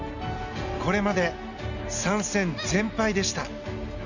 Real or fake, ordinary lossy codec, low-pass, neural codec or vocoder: real; none; 7.2 kHz; none